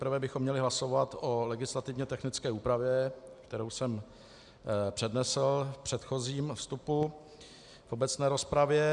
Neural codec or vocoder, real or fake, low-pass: none; real; 10.8 kHz